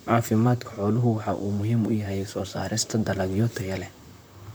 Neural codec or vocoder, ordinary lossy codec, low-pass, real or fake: vocoder, 44.1 kHz, 128 mel bands, Pupu-Vocoder; none; none; fake